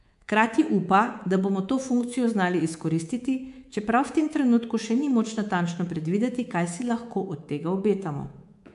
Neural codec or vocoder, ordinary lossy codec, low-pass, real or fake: codec, 24 kHz, 3.1 kbps, DualCodec; MP3, 64 kbps; 10.8 kHz; fake